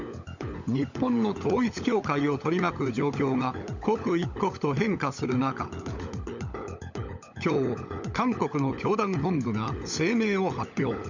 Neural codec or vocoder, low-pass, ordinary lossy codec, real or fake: codec, 16 kHz, 16 kbps, FunCodec, trained on LibriTTS, 50 frames a second; 7.2 kHz; none; fake